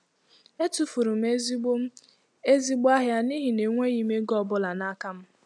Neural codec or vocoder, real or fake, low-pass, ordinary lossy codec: none; real; none; none